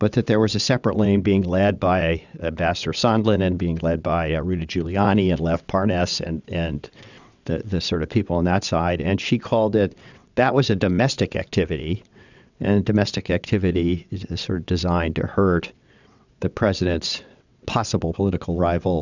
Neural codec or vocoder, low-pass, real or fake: vocoder, 22.05 kHz, 80 mel bands, WaveNeXt; 7.2 kHz; fake